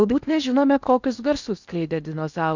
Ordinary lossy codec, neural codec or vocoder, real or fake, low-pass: Opus, 64 kbps; codec, 16 kHz in and 24 kHz out, 0.8 kbps, FocalCodec, streaming, 65536 codes; fake; 7.2 kHz